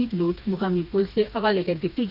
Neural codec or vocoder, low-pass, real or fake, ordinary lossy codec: codec, 16 kHz, 2 kbps, FreqCodec, smaller model; 5.4 kHz; fake; none